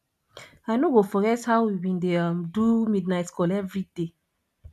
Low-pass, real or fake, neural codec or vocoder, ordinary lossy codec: 14.4 kHz; real; none; none